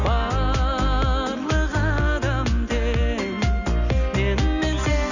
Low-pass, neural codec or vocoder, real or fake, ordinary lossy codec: 7.2 kHz; none; real; none